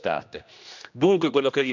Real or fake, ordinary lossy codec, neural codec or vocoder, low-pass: fake; none; codec, 16 kHz, 1 kbps, X-Codec, HuBERT features, trained on general audio; 7.2 kHz